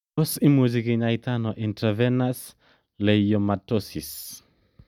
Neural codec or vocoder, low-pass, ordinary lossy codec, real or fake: none; 19.8 kHz; none; real